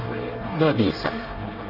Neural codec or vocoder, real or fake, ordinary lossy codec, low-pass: codec, 24 kHz, 1 kbps, SNAC; fake; Opus, 24 kbps; 5.4 kHz